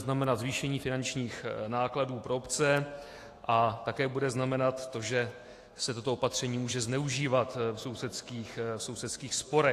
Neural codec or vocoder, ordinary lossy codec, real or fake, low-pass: autoencoder, 48 kHz, 128 numbers a frame, DAC-VAE, trained on Japanese speech; AAC, 48 kbps; fake; 14.4 kHz